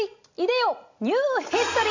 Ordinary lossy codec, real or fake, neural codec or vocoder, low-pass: AAC, 48 kbps; real; none; 7.2 kHz